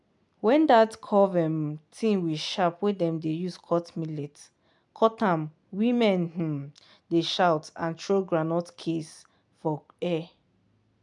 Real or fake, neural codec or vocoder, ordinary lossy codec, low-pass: real; none; none; 10.8 kHz